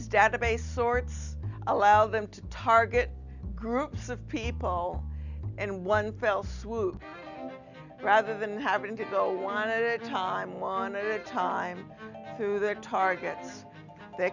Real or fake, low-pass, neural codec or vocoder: real; 7.2 kHz; none